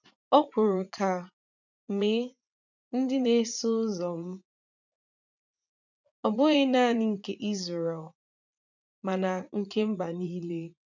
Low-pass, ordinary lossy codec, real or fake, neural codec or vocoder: 7.2 kHz; none; fake; vocoder, 44.1 kHz, 80 mel bands, Vocos